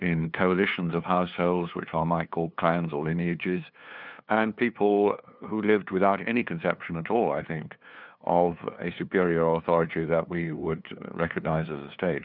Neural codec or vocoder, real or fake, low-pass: codec, 16 kHz, 2 kbps, FunCodec, trained on LibriTTS, 25 frames a second; fake; 5.4 kHz